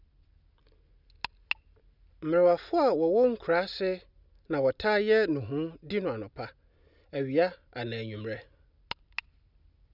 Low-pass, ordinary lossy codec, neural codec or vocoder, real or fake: 5.4 kHz; none; none; real